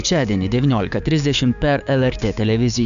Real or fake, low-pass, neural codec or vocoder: fake; 7.2 kHz; codec, 16 kHz, 6 kbps, DAC